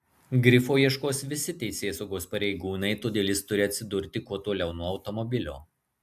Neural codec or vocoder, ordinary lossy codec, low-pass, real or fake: none; AAC, 96 kbps; 14.4 kHz; real